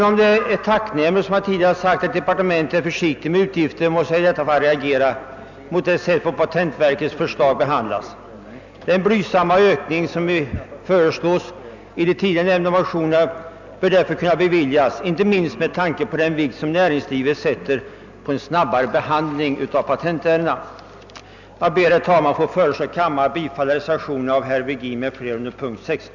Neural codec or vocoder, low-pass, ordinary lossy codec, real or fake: none; 7.2 kHz; none; real